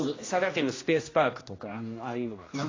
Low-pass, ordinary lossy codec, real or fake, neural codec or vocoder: 7.2 kHz; AAC, 32 kbps; fake; codec, 16 kHz, 1 kbps, X-Codec, HuBERT features, trained on general audio